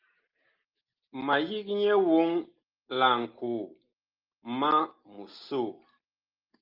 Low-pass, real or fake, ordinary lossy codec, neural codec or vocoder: 5.4 kHz; real; Opus, 24 kbps; none